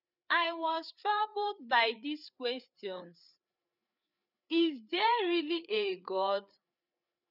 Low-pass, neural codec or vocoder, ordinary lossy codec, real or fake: 5.4 kHz; codec, 16 kHz, 4 kbps, FreqCodec, larger model; none; fake